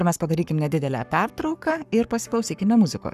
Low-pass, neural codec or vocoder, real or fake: 14.4 kHz; codec, 44.1 kHz, 7.8 kbps, Pupu-Codec; fake